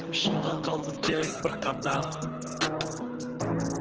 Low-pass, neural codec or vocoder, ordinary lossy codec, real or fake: 7.2 kHz; codec, 24 kHz, 6 kbps, HILCodec; Opus, 16 kbps; fake